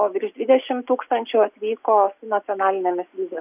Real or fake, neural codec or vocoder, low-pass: real; none; 3.6 kHz